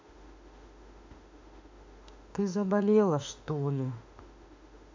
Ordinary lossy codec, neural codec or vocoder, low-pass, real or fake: MP3, 64 kbps; autoencoder, 48 kHz, 32 numbers a frame, DAC-VAE, trained on Japanese speech; 7.2 kHz; fake